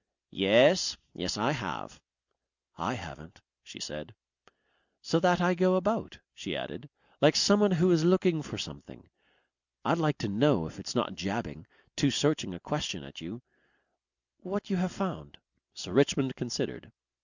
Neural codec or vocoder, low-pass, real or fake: none; 7.2 kHz; real